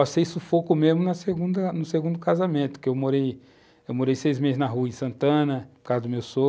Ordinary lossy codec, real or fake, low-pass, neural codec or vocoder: none; real; none; none